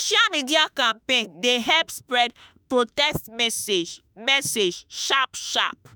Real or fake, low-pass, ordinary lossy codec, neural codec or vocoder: fake; none; none; autoencoder, 48 kHz, 32 numbers a frame, DAC-VAE, trained on Japanese speech